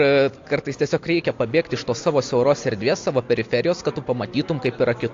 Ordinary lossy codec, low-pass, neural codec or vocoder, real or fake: AAC, 48 kbps; 7.2 kHz; none; real